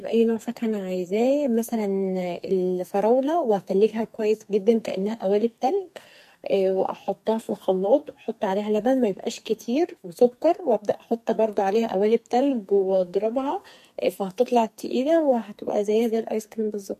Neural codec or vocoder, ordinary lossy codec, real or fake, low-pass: codec, 44.1 kHz, 2.6 kbps, SNAC; MP3, 64 kbps; fake; 14.4 kHz